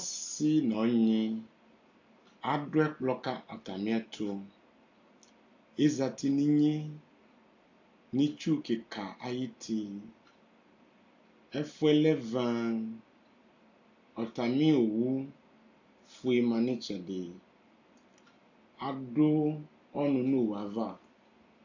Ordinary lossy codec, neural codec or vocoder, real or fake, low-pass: AAC, 48 kbps; none; real; 7.2 kHz